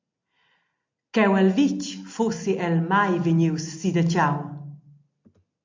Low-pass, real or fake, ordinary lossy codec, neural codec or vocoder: 7.2 kHz; real; MP3, 48 kbps; none